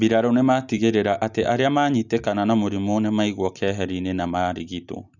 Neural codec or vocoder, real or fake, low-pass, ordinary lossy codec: none; real; 7.2 kHz; none